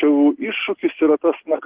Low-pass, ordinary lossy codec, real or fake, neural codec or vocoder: 3.6 kHz; Opus, 16 kbps; real; none